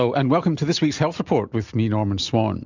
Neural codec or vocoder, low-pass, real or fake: none; 7.2 kHz; real